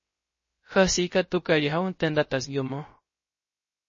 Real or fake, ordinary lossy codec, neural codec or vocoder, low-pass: fake; MP3, 32 kbps; codec, 16 kHz, 0.3 kbps, FocalCodec; 7.2 kHz